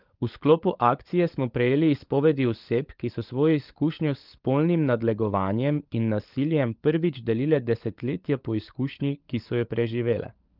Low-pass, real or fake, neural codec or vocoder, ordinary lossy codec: 5.4 kHz; fake; codec, 16 kHz, 16 kbps, FunCodec, trained on LibriTTS, 50 frames a second; Opus, 32 kbps